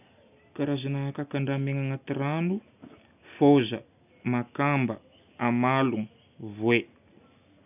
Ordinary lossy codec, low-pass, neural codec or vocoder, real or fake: none; 3.6 kHz; none; real